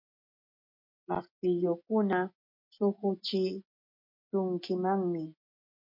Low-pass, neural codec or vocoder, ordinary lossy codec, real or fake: 5.4 kHz; none; MP3, 32 kbps; real